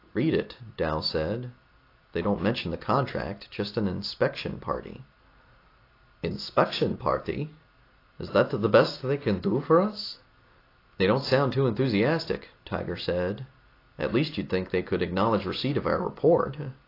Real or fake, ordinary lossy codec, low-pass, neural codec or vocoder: real; AAC, 32 kbps; 5.4 kHz; none